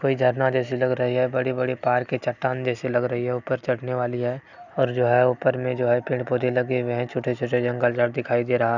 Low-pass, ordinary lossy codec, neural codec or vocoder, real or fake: 7.2 kHz; none; none; real